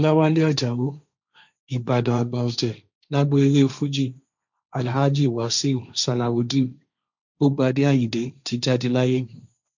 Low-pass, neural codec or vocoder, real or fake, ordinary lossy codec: 7.2 kHz; codec, 16 kHz, 1.1 kbps, Voila-Tokenizer; fake; none